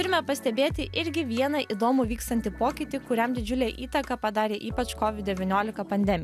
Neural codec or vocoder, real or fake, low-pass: none; real; 14.4 kHz